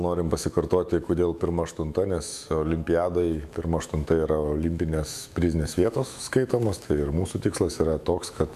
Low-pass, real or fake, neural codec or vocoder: 14.4 kHz; fake; autoencoder, 48 kHz, 128 numbers a frame, DAC-VAE, trained on Japanese speech